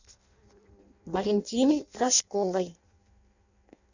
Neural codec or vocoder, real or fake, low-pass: codec, 16 kHz in and 24 kHz out, 0.6 kbps, FireRedTTS-2 codec; fake; 7.2 kHz